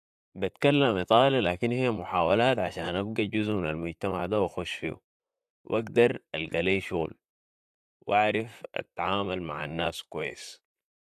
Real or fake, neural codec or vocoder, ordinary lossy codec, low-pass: fake; vocoder, 44.1 kHz, 128 mel bands, Pupu-Vocoder; AAC, 96 kbps; 14.4 kHz